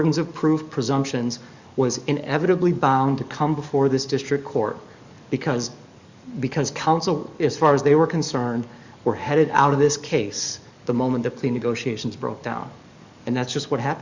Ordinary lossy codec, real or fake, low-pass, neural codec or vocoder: Opus, 64 kbps; fake; 7.2 kHz; codec, 44.1 kHz, 7.8 kbps, DAC